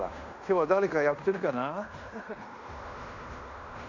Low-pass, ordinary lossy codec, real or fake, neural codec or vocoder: 7.2 kHz; none; fake; codec, 16 kHz in and 24 kHz out, 0.9 kbps, LongCat-Audio-Codec, fine tuned four codebook decoder